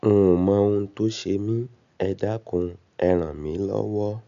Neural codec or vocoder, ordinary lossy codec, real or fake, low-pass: none; none; real; 7.2 kHz